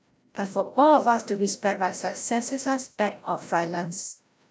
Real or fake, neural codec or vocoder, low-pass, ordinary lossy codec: fake; codec, 16 kHz, 0.5 kbps, FreqCodec, larger model; none; none